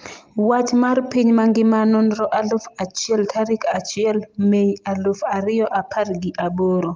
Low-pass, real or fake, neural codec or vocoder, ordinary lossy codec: 7.2 kHz; real; none; Opus, 24 kbps